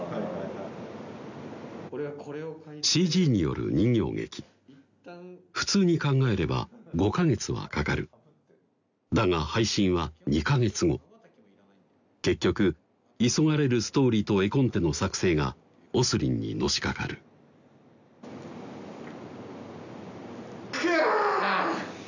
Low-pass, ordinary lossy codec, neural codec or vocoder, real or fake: 7.2 kHz; none; none; real